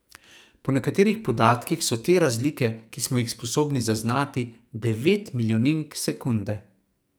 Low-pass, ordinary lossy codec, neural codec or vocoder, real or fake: none; none; codec, 44.1 kHz, 2.6 kbps, SNAC; fake